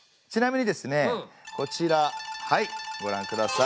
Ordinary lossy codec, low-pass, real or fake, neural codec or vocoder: none; none; real; none